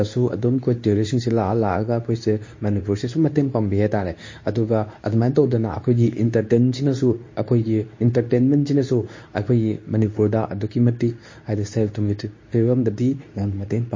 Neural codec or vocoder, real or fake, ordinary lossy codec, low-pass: codec, 24 kHz, 0.9 kbps, WavTokenizer, medium speech release version 2; fake; MP3, 32 kbps; 7.2 kHz